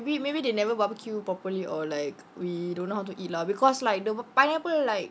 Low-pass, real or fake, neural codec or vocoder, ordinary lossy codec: none; real; none; none